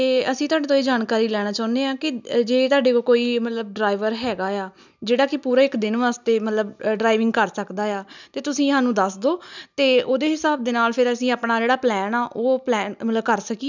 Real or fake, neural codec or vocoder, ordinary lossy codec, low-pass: real; none; none; 7.2 kHz